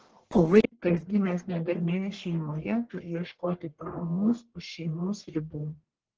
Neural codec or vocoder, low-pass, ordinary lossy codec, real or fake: codec, 44.1 kHz, 1.7 kbps, Pupu-Codec; 7.2 kHz; Opus, 16 kbps; fake